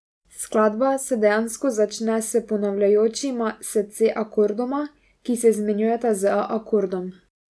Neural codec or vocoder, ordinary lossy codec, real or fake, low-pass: none; none; real; none